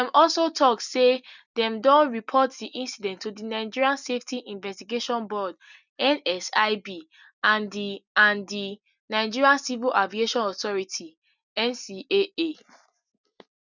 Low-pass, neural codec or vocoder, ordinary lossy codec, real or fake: 7.2 kHz; none; none; real